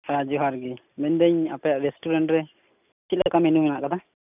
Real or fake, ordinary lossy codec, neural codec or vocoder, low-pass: real; none; none; 3.6 kHz